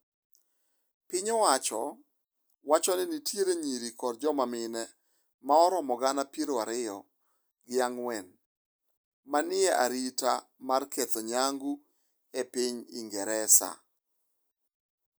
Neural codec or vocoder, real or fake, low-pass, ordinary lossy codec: none; real; none; none